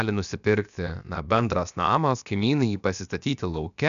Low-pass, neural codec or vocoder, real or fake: 7.2 kHz; codec, 16 kHz, about 1 kbps, DyCAST, with the encoder's durations; fake